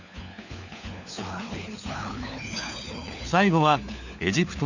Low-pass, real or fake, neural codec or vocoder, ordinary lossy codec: 7.2 kHz; fake; codec, 16 kHz, 4 kbps, FunCodec, trained on LibriTTS, 50 frames a second; none